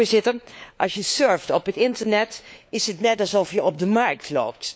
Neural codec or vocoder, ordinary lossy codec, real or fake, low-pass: codec, 16 kHz, 4 kbps, FunCodec, trained on LibriTTS, 50 frames a second; none; fake; none